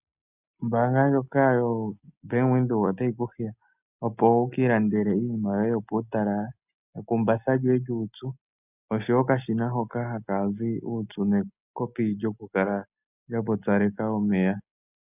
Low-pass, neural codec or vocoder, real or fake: 3.6 kHz; none; real